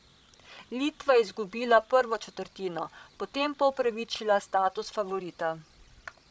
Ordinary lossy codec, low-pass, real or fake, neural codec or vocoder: none; none; fake; codec, 16 kHz, 16 kbps, FreqCodec, larger model